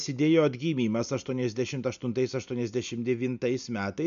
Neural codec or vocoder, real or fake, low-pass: none; real; 7.2 kHz